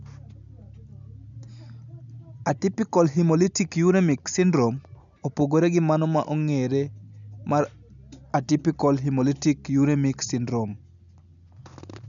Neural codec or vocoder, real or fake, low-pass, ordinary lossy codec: none; real; 7.2 kHz; none